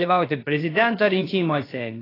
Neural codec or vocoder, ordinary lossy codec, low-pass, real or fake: codec, 16 kHz, about 1 kbps, DyCAST, with the encoder's durations; AAC, 24 kbps; 5.4 kHz; fake